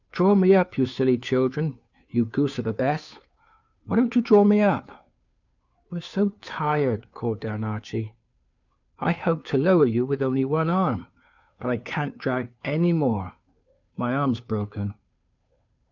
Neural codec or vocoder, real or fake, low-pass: codec, 16 kHz, 2 kbps, FunCodec, trained on Chinese and English, 25 frames a second; fake; 7.2 kHz